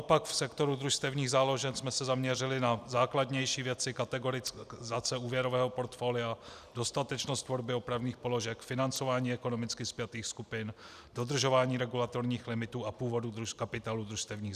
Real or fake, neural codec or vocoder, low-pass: real; none; 14.4 kHz